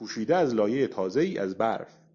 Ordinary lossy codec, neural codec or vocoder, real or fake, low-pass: AAC, 64 kbps; none; real; 7.2 kHz